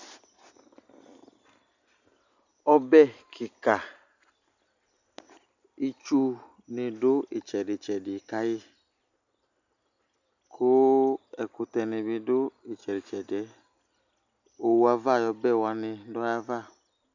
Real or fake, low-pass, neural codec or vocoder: real; 7.2 kHz; none